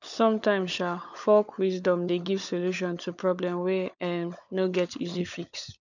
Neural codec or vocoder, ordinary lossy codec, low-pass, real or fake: codec, 16 kHz, 16 kbps, FunCodec, trained on LibriTTS, 50 frames a second; none; 7.2 kHz; fake